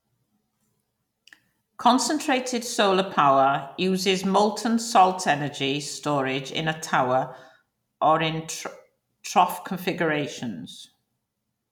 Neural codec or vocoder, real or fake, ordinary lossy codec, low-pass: none; real; none; 19.8 kHz